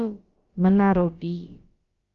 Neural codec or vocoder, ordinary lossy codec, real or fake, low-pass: codec, 16 kHz, about 1 kbps, DyCAST, with the encoder's durations; Opus, 32 kbps; fake; 7.2 kHz